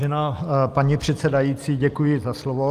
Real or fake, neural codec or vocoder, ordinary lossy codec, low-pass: real; none; Opus, 24 kbps; 14.4 kHz